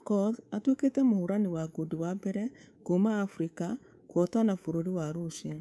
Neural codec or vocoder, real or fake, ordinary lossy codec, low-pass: codec, 24 kHz, 3.1 kbps, DualCodec; fake; none; none